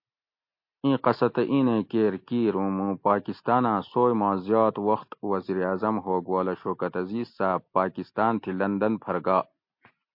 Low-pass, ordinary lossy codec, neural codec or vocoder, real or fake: 5.4 kHz; MP3, 32 kbps; none; real